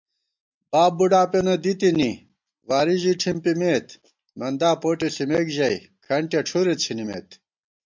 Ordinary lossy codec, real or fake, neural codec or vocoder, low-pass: MP3, 64 kbps; real; none; 7.2 kHz